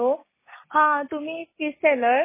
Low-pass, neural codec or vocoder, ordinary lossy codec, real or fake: 3.6 kHz; none; MP3, 16 kbps; real